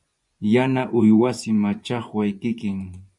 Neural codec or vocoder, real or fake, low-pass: vocoder, 24 kHz, 100 mel bands, Vocos; fake; 10.8 kHz